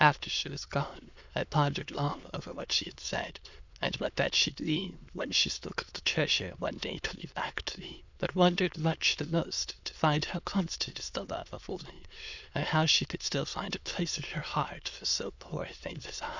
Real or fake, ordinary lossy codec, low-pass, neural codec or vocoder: fake; Opus, 64 kbps; 7.2 kHz; autoencoder, 22.05 kHz, a latent of 192 numbers a frame, VITS, trained on many speakers